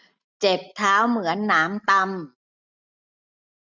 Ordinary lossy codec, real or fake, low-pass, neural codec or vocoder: none; real; 7.2 kHz; none